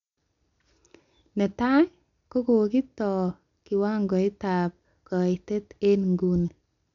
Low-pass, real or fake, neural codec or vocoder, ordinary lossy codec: 7.2 kHz; real; none; none